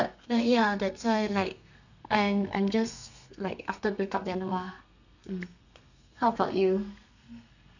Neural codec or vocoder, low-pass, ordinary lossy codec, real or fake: codec, 32 kHz, 1.9 kbps, SNAC; 7.2 kHz; none; fake